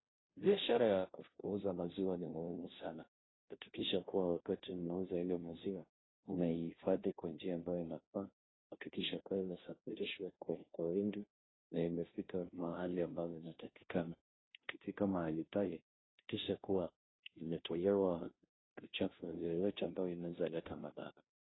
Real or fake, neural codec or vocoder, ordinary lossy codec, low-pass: fake; codec, 16 kHz, 0.5 kbps, FunCodec, trained on Chinese and English, 25 frames a second; AAC, 16 kbps; 7.2 kHz